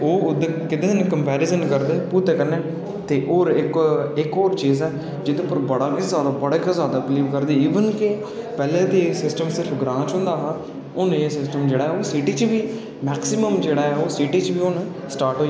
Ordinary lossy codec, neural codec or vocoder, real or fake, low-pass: none; none; real; none